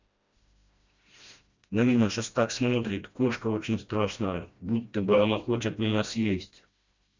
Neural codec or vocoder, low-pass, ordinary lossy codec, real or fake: codec, 16 kHz, 1 kbps, FreqCodec, smaller model; 7.2 kHz; Opus, 64 kbps; fake